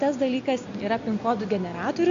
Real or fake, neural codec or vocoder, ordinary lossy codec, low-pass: real; none; MP3, 48 kbps; 7.2 kHz